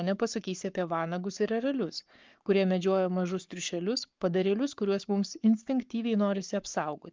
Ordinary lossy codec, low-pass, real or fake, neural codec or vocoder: Opus, 32 kbps; 7.2 kHz; fake; codec, 44.1 kHz, 7.8 kbps, Pupu-Codec